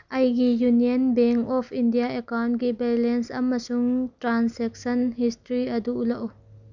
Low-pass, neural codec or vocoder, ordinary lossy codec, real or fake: 7.2 kHz; none; none; real